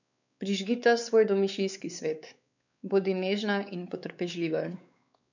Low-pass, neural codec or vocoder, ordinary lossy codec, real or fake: 7.2 kHz; codec, 16 kHz, 4 kbps, X-Codec, WavLM features, trained on Multilingual LibriSpeech; none; fake